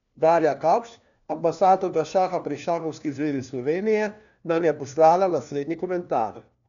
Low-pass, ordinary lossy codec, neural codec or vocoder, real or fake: 7.2 kHz; none; codec, 16 kHz, 1 kbps, FunCodec, trained on LibriTTS, 50 frames a second; fake